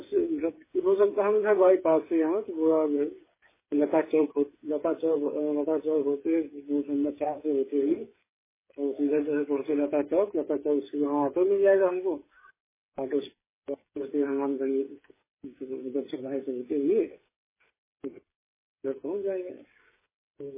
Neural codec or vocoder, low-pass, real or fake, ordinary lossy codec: codec, 16 kHz, 8 kbps, FreqCodec, smaller model; 3.6 kHz; fake; MP3, 16 kbps